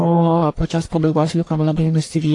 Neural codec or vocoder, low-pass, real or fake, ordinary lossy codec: codec, 44.1 kHz, 1.7 kbps, Pupu-Codec; 10.8 kHz; fake; AAC, 48 kbps